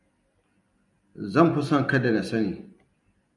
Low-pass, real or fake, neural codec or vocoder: 10.8 kHz; real; none